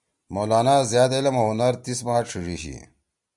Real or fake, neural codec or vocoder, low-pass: real; none; 10.8 kHz